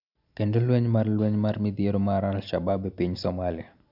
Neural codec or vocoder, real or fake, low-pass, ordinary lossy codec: none; real; 5.4 kHz; none